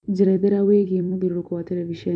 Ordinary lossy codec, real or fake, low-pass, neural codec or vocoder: none; fake; 9.9 kHz; vocoder, 44.1 kHz, 128 mel bands every 256 samples, BigVGAN v2